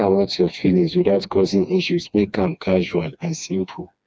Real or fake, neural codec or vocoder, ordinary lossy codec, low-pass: fake; codec, 16 kHz, 2 kbps, FreqCodec, smaller model; none; none